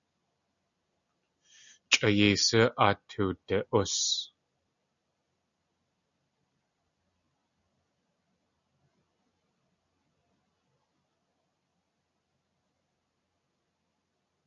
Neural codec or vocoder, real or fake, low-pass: none; real; 7.2 kHz